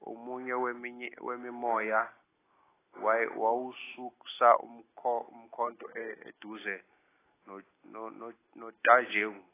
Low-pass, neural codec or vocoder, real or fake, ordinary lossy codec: 3.6 kHz; none; real; AAC, 16 kbps